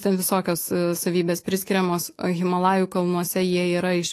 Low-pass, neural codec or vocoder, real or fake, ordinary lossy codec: 14.4 kHz; autoencoder, 48 kHz, 128 numbers a frame, DAC-VAE, trained on Japanese speech; fake; AAC, 48 kbps